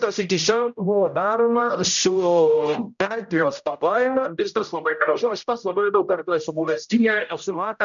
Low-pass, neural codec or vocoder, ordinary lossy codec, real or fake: 7.2 kHz; codec, 16 kHz, 0.5 kbps, X-Codec, HuBERT features, trained on general audio; MP3, 96 kbps; fake